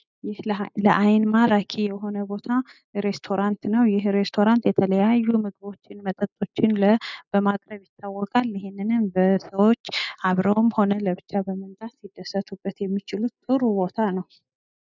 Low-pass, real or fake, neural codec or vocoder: 7.2 kHz; real; none